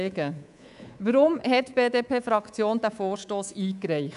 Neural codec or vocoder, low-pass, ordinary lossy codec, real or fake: codec, 24 kHz, 3.1 kbps, DualCodec; 10.8 kHz; none; fake